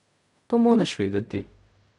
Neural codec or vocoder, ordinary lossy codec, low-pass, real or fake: codec, 16 kHz in and 24 kHz out, 0.4 kbps, LongCat-Audio-Codec, fine tuned four codebook decoder; none; 10.8 kHz; fake